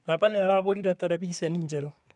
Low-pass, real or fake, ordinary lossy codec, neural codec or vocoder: 10.8 kHz; fake; none; codec, 24 kHz, 1 kbps, SNAC